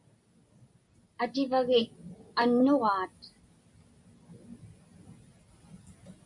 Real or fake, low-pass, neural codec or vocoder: real; 10.8 kHz; none